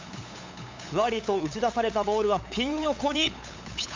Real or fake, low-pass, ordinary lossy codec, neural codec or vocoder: fake; 7.2 kHz; MP3, 64 kbps; codec, 16 kHz, 8 kbps, FunCodec, trained on LibriTTS, 25 frames a second